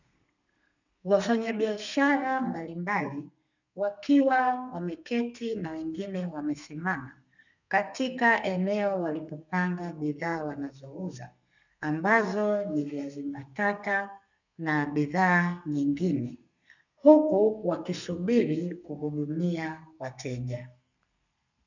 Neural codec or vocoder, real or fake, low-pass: codec, 32 kHz, 1.9 kbps, SNAC; fake; 7.2 kHz